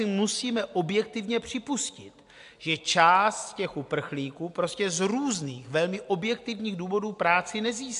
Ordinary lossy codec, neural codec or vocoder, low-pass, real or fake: AAC, 64 kbps; none; 10.8 kHz; real